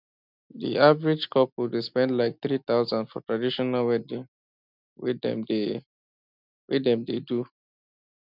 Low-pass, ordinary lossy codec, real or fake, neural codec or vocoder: 5.4 kHz; none; real; none